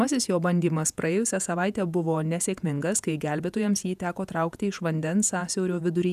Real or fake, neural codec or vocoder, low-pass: fake; vocoder, 48 kHz, 128 mel bands, Vocos; 14.4 kHz